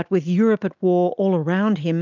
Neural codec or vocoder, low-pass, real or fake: none; 7.2 kHz; real